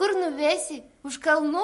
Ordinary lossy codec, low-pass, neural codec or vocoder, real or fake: MP3, 48 kbps; 14.4 kHz; none; real